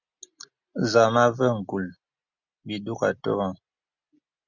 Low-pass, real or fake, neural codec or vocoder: 7.2 kHz; real; none